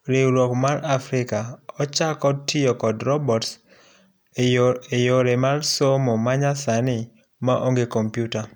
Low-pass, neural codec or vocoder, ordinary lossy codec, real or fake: none; none; none; real